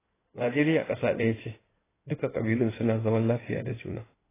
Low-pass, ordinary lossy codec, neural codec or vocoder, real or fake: 3.6 kHz; AAC, 16 kbps; codec, 16 kHz in and 24 kHz out, 2.2 kbps, FireRedTTS-2 codec; fake